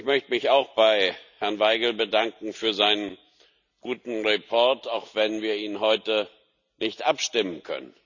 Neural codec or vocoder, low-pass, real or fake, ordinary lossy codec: none; 7.2 kHz; real; none